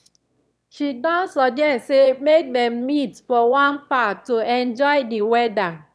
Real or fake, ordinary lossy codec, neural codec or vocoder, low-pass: fake; none; autoencoder, 22.05 kHz, a latent of 192 numbers a frame, VITS, trained on one speaker; none